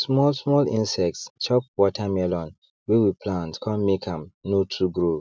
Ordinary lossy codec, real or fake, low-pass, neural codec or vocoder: none; real; none; none